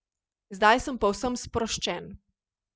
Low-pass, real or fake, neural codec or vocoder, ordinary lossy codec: none; real; none; none